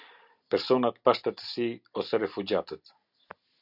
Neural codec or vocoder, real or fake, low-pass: none; real; 5.4 kHz